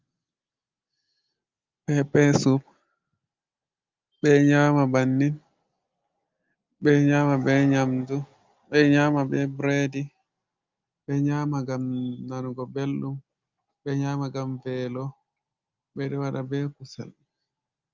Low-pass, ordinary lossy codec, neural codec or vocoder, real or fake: 7.2 kHz; Opus, 24 kbps; none; real